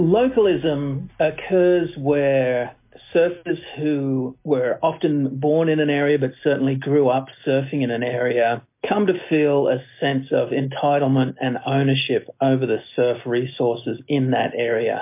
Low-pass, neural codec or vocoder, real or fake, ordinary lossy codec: 3.6 kHz; none; real; MP3, 24 kbps